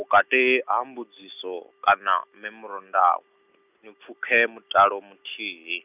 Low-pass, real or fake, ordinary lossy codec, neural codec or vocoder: 3.6 kHz; real; none; none